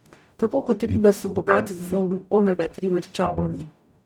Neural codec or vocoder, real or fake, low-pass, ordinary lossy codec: codec, 44.1 kHz, 0.9 kbps, DAC; fake; 19.8 kHz; none